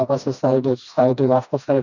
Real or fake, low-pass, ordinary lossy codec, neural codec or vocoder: fake; 7.2 kHz; none; codec, 16 kHz, 1 kbps, FreqCodec, smaller model